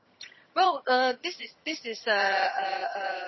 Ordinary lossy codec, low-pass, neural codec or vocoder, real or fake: MP3, 24 kbps; 7.2 kHz; vocoder, 22.05 kHz, 80 mel bands, HiFi-GAN; fake